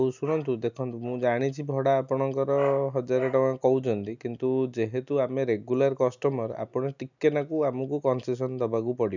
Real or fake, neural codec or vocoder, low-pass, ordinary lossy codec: real; none; 7.2 kHz; none